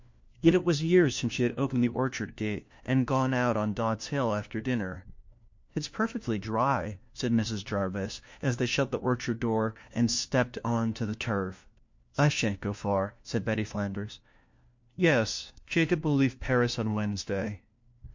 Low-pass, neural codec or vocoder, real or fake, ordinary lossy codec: 7.2 kHz; codec, 16 kHz, 1 kbps, FunCodec, trained on LibriTTS, 50 frames a second; fake; MP3, 48 kbps